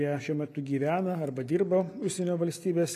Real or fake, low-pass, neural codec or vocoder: real; 14.4 kHz; none